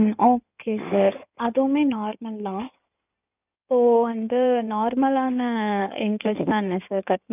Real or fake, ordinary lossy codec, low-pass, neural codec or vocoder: fake; none; 3.6 kHz; codec, 16 kHz in and 24 kHz out, 2.2 kbps, FireRedTTS-2 codec